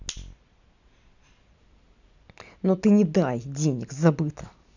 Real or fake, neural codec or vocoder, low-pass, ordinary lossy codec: real; none; 7.2 kHz; none